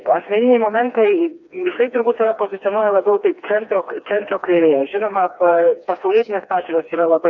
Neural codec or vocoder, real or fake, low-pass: codec, 16 kHz, 2 kbps, FreqCodec, smaller model; fake; 7.2 kHz